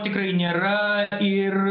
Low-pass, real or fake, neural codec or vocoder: 5.4 kHz; real; none